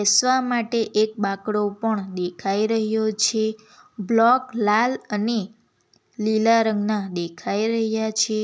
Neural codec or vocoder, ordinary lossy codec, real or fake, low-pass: none; none; real; none